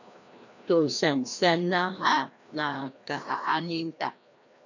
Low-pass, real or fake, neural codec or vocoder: 7.2 kHz; fake; codec, 16 kHz, 1 kbps, FreqCodec, larger model